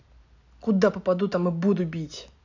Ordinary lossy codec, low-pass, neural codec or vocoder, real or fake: none; 7.2 kHz; none; real